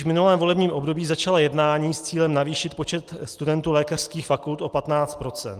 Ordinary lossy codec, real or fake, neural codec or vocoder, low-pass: Opus, 24 kbps; real; none; 14.4 kHz